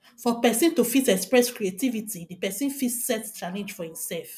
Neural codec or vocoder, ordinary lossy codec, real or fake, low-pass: none; none; real; 14.4 kHz